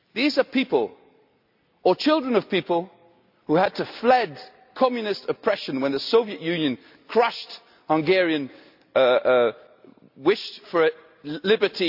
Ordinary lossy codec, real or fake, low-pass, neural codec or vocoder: AAC, 48 kbps; real; 5.4 kHz; none